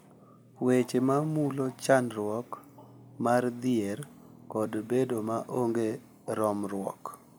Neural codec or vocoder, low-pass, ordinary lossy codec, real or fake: none; none; none; real